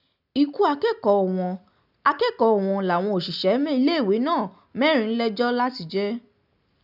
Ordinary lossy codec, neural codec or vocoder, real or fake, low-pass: none; none; real; 5.4 kHz